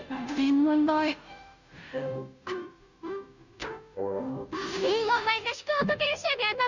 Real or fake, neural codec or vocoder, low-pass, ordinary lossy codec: fake; codec, 16 kHz, 0.5 kbps, FunCodec, trained on Chinese and English, 25 frames a second; 7.2 kHz; none